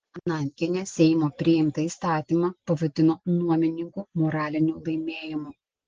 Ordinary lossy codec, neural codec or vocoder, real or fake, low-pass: Opus, 16 kbps; none; real; 7.2 kHz